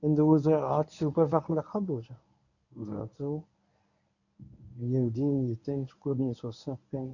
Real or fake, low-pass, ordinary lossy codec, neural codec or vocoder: fake; 7.2 kHz; none; codec, 24 kHz, 0.9 kbps, WavTokenizer, medium speech release version 1